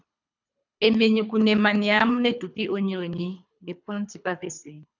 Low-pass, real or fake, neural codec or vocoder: 7.2 kHz; fake; codec, 24 kHz, 3 kbps, HILCodec